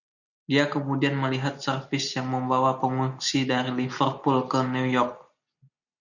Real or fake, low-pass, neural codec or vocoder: real; 7.2 kHz; none